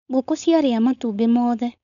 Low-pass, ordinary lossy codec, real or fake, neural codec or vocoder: 7.2 kHz; none; fake; codec, 16 kHz, 4.8 kbps, FACodec